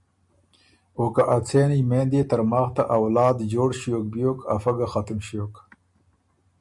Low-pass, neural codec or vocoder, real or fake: 10.8 kHz; none; real